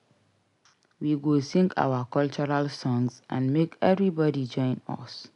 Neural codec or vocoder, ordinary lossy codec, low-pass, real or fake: none; none; 10.8 kHz; real